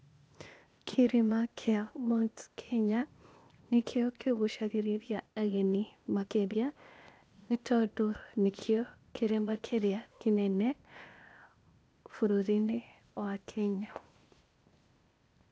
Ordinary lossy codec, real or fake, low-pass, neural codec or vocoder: none; fake; none; codec, 16 kHz, 0.8 kbps, ZipCodec